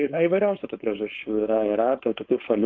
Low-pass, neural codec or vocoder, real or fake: 7.2 kHz; codec, 16 kHz, 4.8 kbps, FACodec; fake